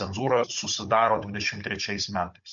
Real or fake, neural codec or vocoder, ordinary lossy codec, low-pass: fake; codec, 16 kHz, 16 kbps, FunCodec, trained on Chinese and English, 50 frames a second; MP3, 48 kbps; 7.2 kHz